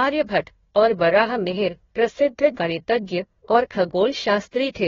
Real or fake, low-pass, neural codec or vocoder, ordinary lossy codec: fake; 7.2 kHz; codec, 16 kHz, 1 kbps, FunCodec, trained on Chinese and English, 50 frames a second; AAC, 24 kbps